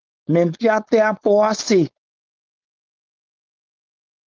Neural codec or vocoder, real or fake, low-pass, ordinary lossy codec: codec, 16 kHz, 4.8 kbps, FACodec; fake; 7.2 kHz; Opus, 32 kbps